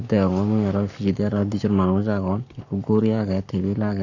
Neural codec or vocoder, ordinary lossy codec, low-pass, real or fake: codec, 44.1 kHz, 7.8 kbps, Pupu-Codec; none; 7.2 kHz; fake